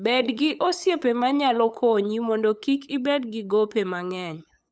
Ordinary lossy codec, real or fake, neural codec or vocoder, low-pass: none; fake; codec, 16 kHz, 8 kbps, FunCodec, trained on LibriTTS, 25 frames a second; none